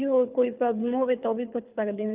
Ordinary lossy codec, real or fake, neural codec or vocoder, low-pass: Opus, 24 kbps; fake; codec, 24 kHz, 6 kbps, HILCodec; 3.6 kHz